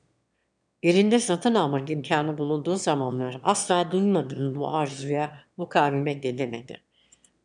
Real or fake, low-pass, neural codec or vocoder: fake; 9.9 kHz; autoencoder, 22.05 kHz, a latent of 192 numbers a frame, VITS, trained on one speaker